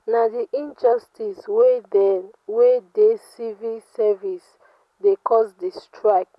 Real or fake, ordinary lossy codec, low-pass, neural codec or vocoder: real; none; none; none